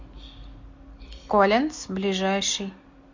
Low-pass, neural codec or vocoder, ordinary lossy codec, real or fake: 7.2 kHz; none; MP3, 48 kbps; real